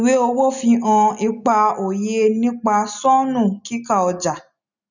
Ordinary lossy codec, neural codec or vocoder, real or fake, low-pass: none; none; real; 7.2 kHz